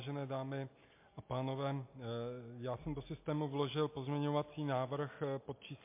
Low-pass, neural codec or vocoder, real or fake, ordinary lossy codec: 3.6 kHz; none; real; MP3, 24 kbps